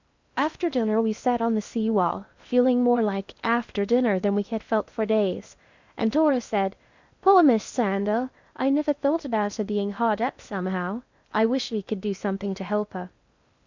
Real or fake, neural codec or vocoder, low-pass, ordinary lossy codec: fake; codec, 16 kHz in and 24 kHz out, 0.6 kbps, FocalCodec, streaming, 2048 codes; 7.2 kHz; Opus, 64 kbps